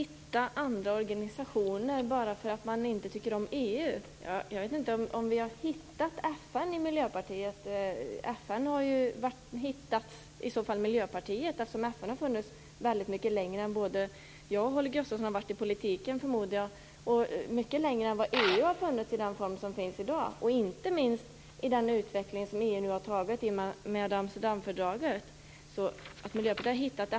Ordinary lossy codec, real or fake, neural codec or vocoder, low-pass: none; real; none; none